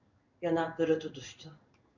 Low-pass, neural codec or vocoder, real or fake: 7.2 kHz; codec, 16 kHz in and 24 kHz out, 1 kbps, XY-Tokenizer; fake